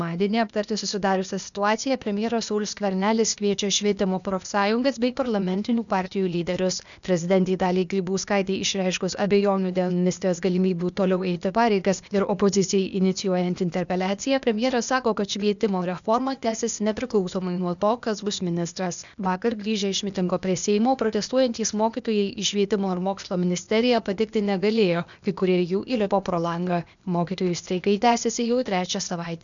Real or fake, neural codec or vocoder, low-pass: fake; codec, 16 kHz, 0.8 kbps, ZipCodec; 7.2 kHz